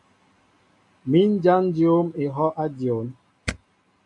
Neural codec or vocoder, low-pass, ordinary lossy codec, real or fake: none; 10.8 kHz; AAC, 64 kbps; real